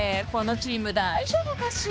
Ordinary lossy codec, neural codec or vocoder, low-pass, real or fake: none; codec, 16 kHz, 2 kbps, X-Codec, HuBERT features, trained on balanced general audio; none; fake